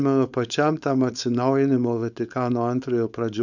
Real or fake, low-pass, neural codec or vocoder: fake; 7.2 kHz; codec, 16 kHz, 4.8 kbps, FACodec